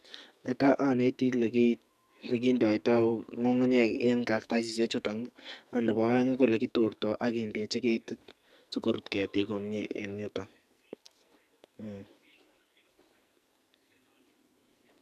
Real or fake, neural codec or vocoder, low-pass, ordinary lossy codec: fake; codec, 44.1 kHz, 2.6 kbps, SNAC; 14.4 kHz; none